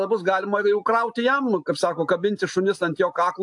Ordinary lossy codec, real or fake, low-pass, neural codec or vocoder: AAC, 64 kbps; real; 10.8 kHz; none